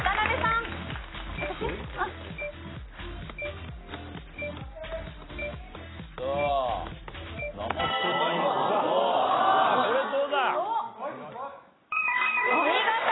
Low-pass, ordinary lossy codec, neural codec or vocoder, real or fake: 7.2 kHz; AAC, 16 kbps; none; real